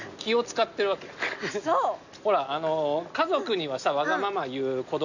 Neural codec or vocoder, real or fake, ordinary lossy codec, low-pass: none; real; none; 7.2 kHz